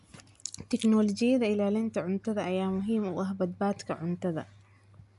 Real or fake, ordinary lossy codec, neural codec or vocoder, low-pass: real; none; none; 10.8 kHz